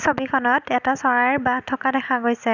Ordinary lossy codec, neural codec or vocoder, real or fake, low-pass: none; none; real; 7.2 kHz